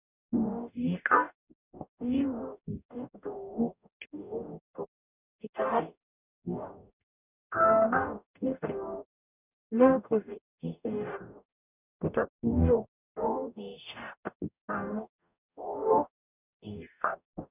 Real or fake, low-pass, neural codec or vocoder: fake; 3.6 kHz; codec, 44.1 kHz, 0.9 kbps, DAC